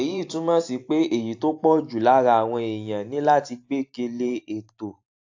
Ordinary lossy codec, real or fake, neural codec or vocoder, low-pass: AAC, 48 kbps; fake; vocoder, 44.1 kHz, 128 mel bands every 512 samples, BigVGAN v2; 7.2 kHz